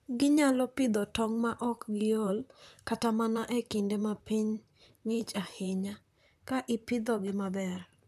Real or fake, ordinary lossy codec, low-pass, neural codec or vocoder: fake; none; 14.4 kHz; vocoder, 44.1 kHz, 128 mel bands, Pupu-Vocoder